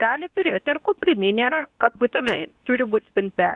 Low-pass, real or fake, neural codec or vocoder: 10.8 kHz; fake; codec, 24 kHz, 0.9 kbps, WavTokenizer, medium speech release version 2